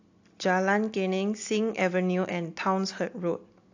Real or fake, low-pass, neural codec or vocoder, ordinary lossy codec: real; 7.2 kHz; none; AAC, 48 kbps